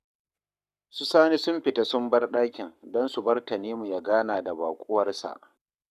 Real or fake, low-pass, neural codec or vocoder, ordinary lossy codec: fake; 14.4 kHz; codec, 44.1 kHz, 7.8 kbps, Pupu-Codec; none